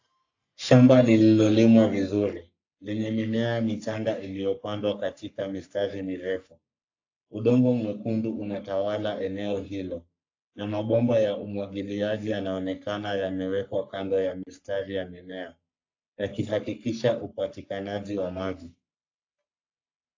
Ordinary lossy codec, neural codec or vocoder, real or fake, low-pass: AAC, 48 kbps; codec, 44.1 kHz, 3.4 kbps, Pupu-Codec; fake; 7.2 kHz